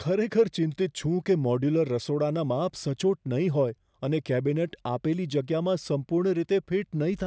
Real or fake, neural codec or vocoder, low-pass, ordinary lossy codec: real; none; none; none